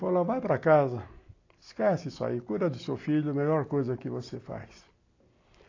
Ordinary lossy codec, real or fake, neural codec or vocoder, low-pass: AAC, 32 kbps; real; none; 7.2 kHz